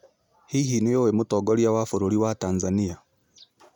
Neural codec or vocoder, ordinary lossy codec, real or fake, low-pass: none; none; real; 19.8 kHz